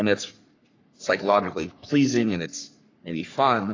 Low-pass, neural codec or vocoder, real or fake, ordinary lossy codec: 7.2 kHz; codec, 44.1 kHz, 3.4 kbps, Pupu-Codec; fake; AAC, 32 kbps